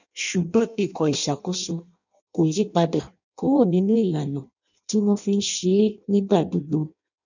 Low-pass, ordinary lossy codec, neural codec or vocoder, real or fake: 7.2 kHz; none; codec, 16 kHz in and 24 kHz out, 0.6 kbps, FireRedTTS-2 codec; fake